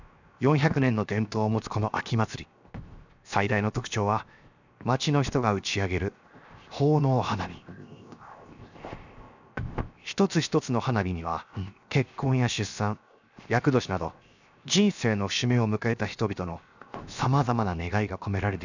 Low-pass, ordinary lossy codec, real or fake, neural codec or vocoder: 7.2 kHz; none; fake; codec, 16 kHz, 0.7 kbps, FocalCodec